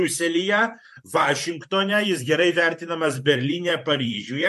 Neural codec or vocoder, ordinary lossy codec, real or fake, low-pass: vocoder, 44.1 kHz, 128 mel bands, Pupu-Vocoder; MP3, 64 kbps; fake; 14.4 kHz